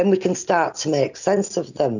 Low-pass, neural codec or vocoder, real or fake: 7.2 kHz; none; real